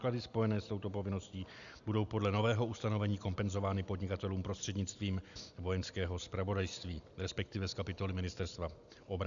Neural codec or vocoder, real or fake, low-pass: none; real; 7.2 kHz